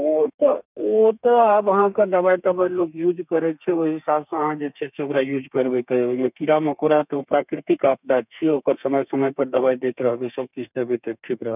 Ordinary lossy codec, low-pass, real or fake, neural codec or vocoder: none; 3.6 kHz; fake; codec, 32 kHz, 1.9 kbps, SNAC